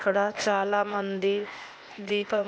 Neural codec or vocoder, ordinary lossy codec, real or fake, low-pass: codec, 16 kHz, 0.8 kbps, ZipCodec; none; fake; none